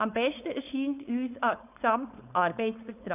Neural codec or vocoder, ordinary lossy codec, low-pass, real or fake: codec, 16 kHz, 4.8 kbps, FACodec; AAC, 32 kbps; 3.6 kHz; fake